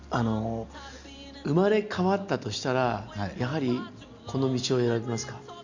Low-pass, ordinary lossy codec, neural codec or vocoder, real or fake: 7.2 kHz; Opus, 64 kbps; none; real